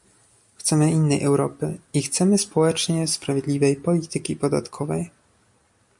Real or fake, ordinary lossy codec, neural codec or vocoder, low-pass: real; MP3, 64 kbps; none; 10.8 kHz